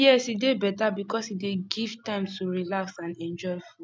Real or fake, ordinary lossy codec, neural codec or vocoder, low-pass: real; none; none; none